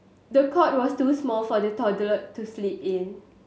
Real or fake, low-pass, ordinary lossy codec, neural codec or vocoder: real; none; none; none